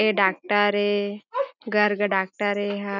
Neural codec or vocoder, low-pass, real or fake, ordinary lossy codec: none; 7.2 kHz; real; none